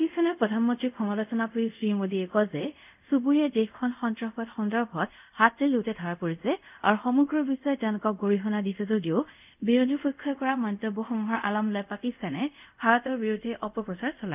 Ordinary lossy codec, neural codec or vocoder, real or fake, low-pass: none; codec, 24 kHz, 0.5 kbps, DualCodec; fake; 3.6 kHz